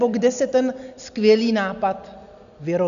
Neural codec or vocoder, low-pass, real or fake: none; 7.2 kHz; real